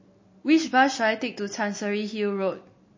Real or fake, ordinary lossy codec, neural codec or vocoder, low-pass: real; MP3, 32 kbps; none; 7.2 kHz